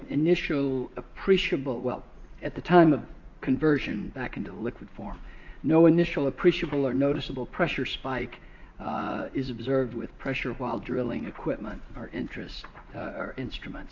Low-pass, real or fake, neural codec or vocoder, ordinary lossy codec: 7.2 kHz; fake; vocoder, 44.1 kHz, 80 mel bands, Vocos; AAC, 48 kbps